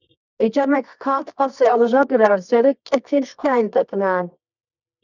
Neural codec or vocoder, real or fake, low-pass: codec, 24 kHz, 0.9 kbps, WavTokenizer, medium music audio release; fake; 7.2 kHz